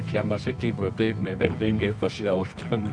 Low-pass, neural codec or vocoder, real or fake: 9.9 kHz; codec, 24 kHz, 0.9 kbps, WavTokenizer, medium music audio release; fake